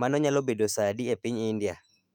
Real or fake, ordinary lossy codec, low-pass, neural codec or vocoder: fake; none; 19.8 kHz; autoencoder, 48 kHz, 128 numbers a frame, DAC-VAE, trained on Japanese speech